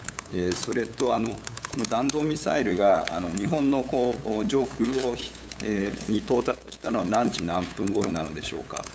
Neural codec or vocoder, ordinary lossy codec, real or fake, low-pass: codec, 16 kHz, 8 kbps, FunCodec, trained on LibriTTS, 25 frames a second; none; fake; none